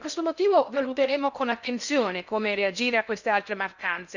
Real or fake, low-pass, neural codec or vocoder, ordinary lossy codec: fake; 7.2 kHz; codec, 16 kHz in and 24 kHz out, 0.6 kbps, FocalCodec, streaming, 4096 codes; none